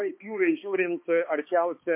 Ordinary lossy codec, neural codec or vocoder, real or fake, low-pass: MP3, 24 kbps; codec, 16 kHz, 2 kbps, X-Codec, HuBERT features, trained on balanced general audio; fake; 3.6 kHz